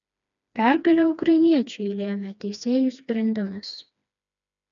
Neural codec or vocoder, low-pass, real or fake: codec, 16 kHz, 2 kbps, FreqCodec, smaller model; 7.2 kHz; fake